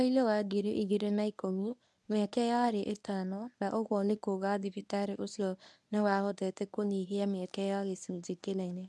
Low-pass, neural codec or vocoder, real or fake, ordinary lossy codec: none; codec, 24 kHz, 0.9 kbps, WavTokenizer, medium speech release version 1; fake; none